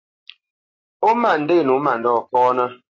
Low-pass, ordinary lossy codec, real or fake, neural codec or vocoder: 7.2 kHz; AAC, 32 kbps; real; none